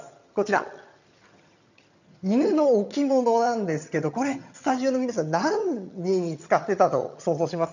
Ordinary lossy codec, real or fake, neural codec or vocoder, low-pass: none; fake; vocoder, 22.05 kHz, 80 mel bands, HiFi-GAN; 7.2 kHz